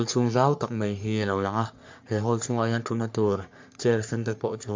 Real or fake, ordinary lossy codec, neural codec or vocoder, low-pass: fake; MP3, 64 kbps; codec, 44.1 kHz, 3.4 kbps, Pupu-Codec; 7.2 kHz